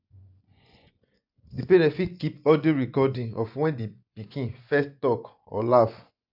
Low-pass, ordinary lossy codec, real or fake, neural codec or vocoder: 5.4 kHz; none; fake; vocoder, 24 kHz, 100 mel bands, Vocos